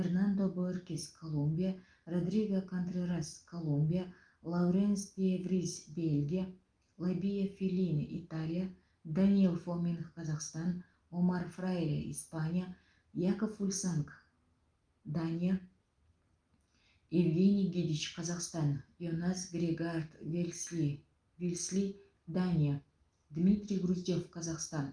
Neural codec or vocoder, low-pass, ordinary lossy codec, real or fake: none; 9.9 kHz; Opus, 32 kbps; real